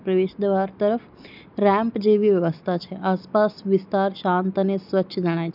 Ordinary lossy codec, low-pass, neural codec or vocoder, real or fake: none; 5.4 kHz; none; real